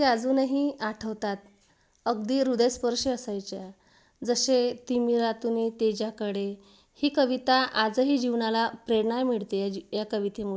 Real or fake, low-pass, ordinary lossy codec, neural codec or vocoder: real; none; none; none